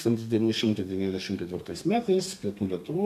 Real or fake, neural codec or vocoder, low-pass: fake; codec, 32 kHz, 1.9 kbps, SNAC; 14.4 kHz